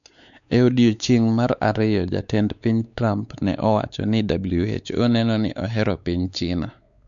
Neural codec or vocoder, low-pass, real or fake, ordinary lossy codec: codec, 16 kHz, 4 kbps, X-Codec, WavLM features, trained on Multilingual LibriSpeech; 7.2 kHz; fake; none